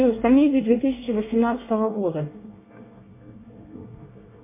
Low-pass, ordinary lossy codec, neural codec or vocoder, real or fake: 3.6 kHz; MP3, 24 kbps; codec, 24 kHz, 1 kbps, SNAC; fake